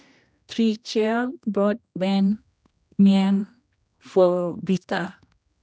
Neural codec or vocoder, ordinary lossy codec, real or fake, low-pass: codec, 16 kHz, 1 kbps, X-Codec, HuBERT features, trained on general audio; none; fake; none